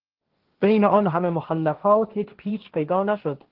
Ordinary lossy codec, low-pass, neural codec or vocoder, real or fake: Opus, 16 kbps; 5.4 kHz; codec, 16 kHz, 1.1 kbps, Voila-Tokenizer; fake